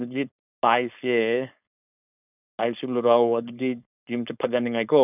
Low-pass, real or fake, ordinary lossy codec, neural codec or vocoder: 3.6 kHz; fake; none; codec, 16 kHz, 4.8 kbps, FACodec